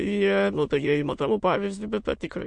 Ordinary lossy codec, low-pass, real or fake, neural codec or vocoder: MP3, 48 kbps; 9.9 kHz; fake; autoencoder, 22.05 kHz, a latent of 192 numbers a frame, VITS, trained on many speakers